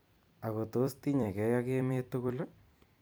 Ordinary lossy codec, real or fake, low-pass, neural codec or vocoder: none; fake; none; vocoder, 44.1 kHz, 128 mel bands every 256 samples, BigVGAN v2